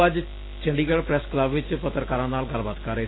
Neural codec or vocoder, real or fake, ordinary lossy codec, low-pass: none; real; AAC, 16 kbps; 7.2 kHz